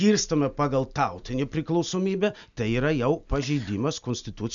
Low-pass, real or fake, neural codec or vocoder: 7.2 kHz; real; none